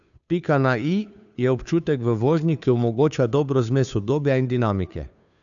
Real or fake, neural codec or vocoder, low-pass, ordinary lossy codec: fake; codec, 16 kHz, 2 kbps, FunCodec, trained on Chinese and English, 25 frames a second; 7.2 kHz; none